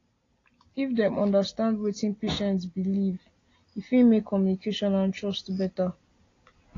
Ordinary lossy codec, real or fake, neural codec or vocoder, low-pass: AAC, 32 kbps; real; none; 7.2 kHz